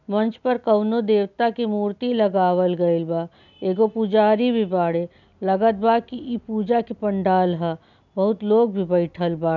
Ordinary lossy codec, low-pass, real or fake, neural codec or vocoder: none; 7.2 kHz; real; none